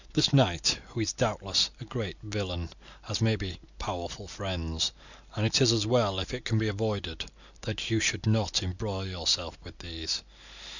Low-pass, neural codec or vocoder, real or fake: 7.2 kHz; none; real